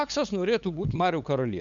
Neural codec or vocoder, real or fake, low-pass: codec, 16 kHz, 8 kbps, FunCodec, trained on LibriTTS, 25 frames a second; fake; 7.2 kHz